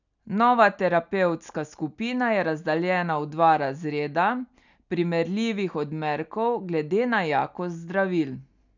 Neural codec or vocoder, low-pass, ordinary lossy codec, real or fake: none; 7.2 kHz; none; real